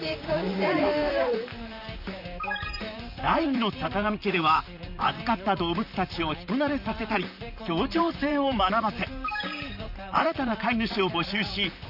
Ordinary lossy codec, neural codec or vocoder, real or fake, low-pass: none; vocoder, 44.1 kHz, 128 mel bands, Pupu-Vocoder; fake; 5.4 kHz